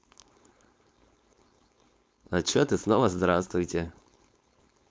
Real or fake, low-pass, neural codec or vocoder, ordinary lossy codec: fake; none; codec, 16 kHz, 4.8 kbps, FACodec; none